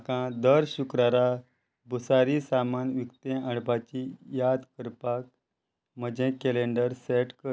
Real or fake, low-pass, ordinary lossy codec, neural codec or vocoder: real; none; none; none